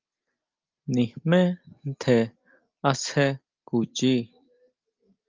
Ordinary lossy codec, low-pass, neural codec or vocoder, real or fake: Opus, 24 kbps; 7.2 kHz; none; real